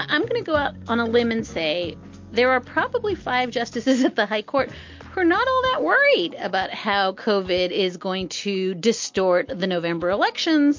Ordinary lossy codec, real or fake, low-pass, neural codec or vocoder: MP3, 48 kbps; real; 7.2 kHz; none